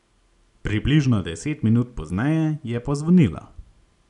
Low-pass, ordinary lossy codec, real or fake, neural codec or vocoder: 10.8 kHz; none; real; none